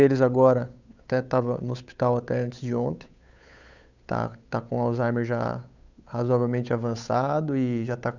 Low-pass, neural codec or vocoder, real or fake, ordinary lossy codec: 7.2 kHz; codec, 16 kHz, 8 kbps, FunCodec, trained on Chinese and English, 25 frames a second; fake; none